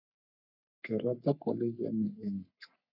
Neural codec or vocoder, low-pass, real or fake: codec, 44.1 kHz, 3.4 kbps, Pupu-Codec; 5.4 kHz; fake